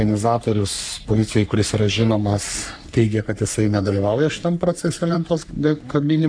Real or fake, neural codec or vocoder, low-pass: fake; codec, 44.1 kHz, 3.4 kbps, Pupu-Codec; 9.9 kHz